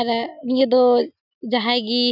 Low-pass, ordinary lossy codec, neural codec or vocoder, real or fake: 5.4 kHz; none; none; real